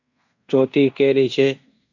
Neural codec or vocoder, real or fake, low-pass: codec, 16 kHz in and 24 kHz out, 0.9 kbps, LongCat-Audio-Codec, fine tuned four codebook decoder; fake; 7.2 kHz